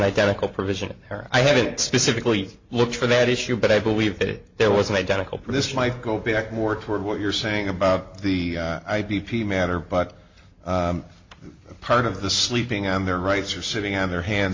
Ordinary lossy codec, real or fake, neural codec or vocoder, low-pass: MP3, 48 kbps; real; none; 7.2 kHz